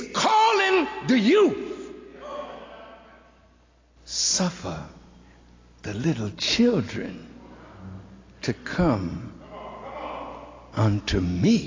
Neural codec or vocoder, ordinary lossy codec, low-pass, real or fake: none; AAC, 32 kbps; 7.2 kHz; real